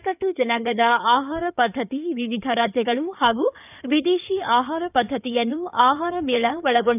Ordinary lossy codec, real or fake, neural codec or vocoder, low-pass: none; fake; codec, 16 kHz in and 24 kHz out, 2.2 kbps, FireRedTTS-2 codec; 3.6 kHz